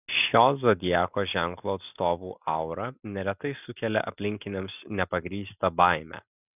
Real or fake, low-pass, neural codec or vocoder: real; 3.6 kHz; none